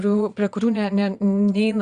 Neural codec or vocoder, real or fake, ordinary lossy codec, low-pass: vocoder, 22.05 kHz, 80 mel bands, Vocos; fake; Opus, 64 kbps; 9.9 kHz